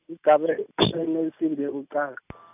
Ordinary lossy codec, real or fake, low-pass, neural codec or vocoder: AAC, 32 kbps; real; 3.6 kHz; none